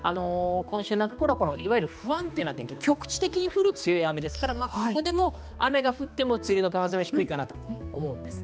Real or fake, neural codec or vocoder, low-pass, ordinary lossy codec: fake; codec, 16 kHz, 2 kbps, X-Codec, HuBERT features, trained on balanced general audio; none; none